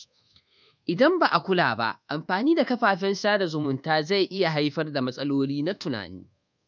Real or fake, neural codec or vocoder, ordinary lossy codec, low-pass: fake; codec, 24 kHz, 1.2 kbps, DualCodec; none; 7.2 kHz